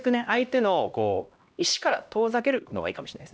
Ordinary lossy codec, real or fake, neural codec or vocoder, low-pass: none; fake; codec, 16 kHz, 1 kbps, X-Codec, HuBERT features, trained on LibriSpeech; none